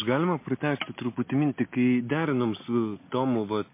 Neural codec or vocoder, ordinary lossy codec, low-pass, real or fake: none; MP3, 24 kbps; 3.6 kHz; real